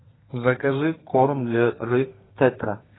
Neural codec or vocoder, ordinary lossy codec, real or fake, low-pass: codec, 32 kHz, 1.9 kbps, SNAC; AAC, 16 kbps; fake; 7.2 kHz